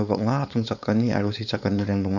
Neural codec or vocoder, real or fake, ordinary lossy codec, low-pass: codec, 16 kHz, 4.8 kbps, FACodec; fake; none; 7.2 kHz